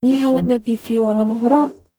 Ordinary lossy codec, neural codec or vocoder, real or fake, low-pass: none; codec, 44.1 kHz, 0.9 kbps, DAC; fake; none